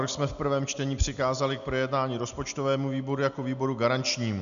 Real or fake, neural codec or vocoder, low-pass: real; none; 7.2 kHz